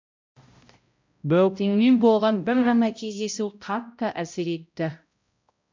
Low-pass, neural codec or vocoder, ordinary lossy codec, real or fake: 7.2 kHz; codec, 16 kHz, 0.5 kbps, X-Codec, HuBERT features, trained on balanced general audio; MP3, 64 kbps; fake